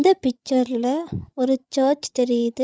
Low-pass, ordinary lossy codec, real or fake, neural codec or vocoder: none; none; fake; codec, 16 kHz, 16 kbps, FreqCodec, larger model